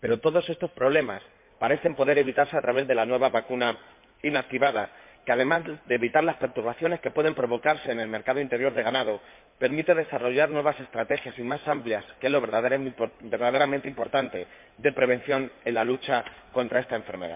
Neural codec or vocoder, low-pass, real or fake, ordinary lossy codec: codec, 16 kHz in and 24 kHz out, 2.2 kbps, FireRedTTS-2 codec; 3.6 kHz; fake; MP3, 32 kbps